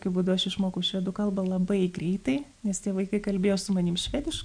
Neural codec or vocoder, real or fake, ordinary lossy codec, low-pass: none; real; MP3, 64 kbps; 9.9 kHz